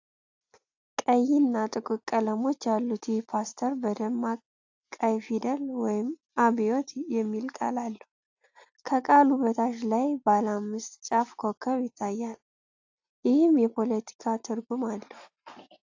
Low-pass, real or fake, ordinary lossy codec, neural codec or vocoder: 7.2 kHz; real; AAC, 48 kbps; none